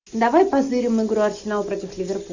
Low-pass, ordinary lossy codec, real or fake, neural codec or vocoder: 7.2 kHz; Opus, 64 kbps; real; none